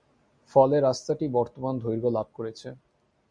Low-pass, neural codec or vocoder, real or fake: 9.9 kHz; none; real